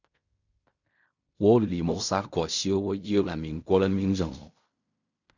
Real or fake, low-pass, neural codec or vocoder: fake; 7.2 kHz; codec, 16 kHz in and 24 kHz out, 0.4 kbps, LongCat-Audio-Codec, fine tuned four codebook decoder